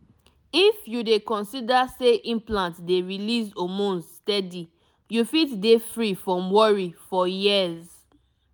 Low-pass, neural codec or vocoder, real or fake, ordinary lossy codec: none; none; real; none